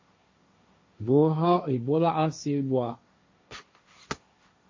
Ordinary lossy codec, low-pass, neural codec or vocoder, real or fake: MP3, 32 kbps; 7.2 kHz; codec, 16 kHz, 1.1 kbps, Voila-Tokenizer; fake